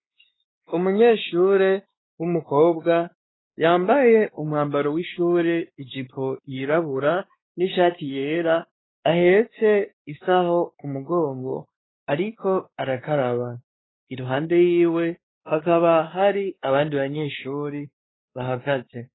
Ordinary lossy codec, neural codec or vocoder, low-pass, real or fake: AAC, 16 kbps; codec, 16 kHz, 2 kbps, X-Codec, WavLM features, trained on Multilingual LibriSpeech; 7.2 kHz; fake